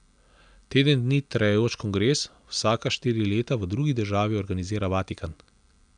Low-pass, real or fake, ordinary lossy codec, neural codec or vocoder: 9.9 kHz; real; none; none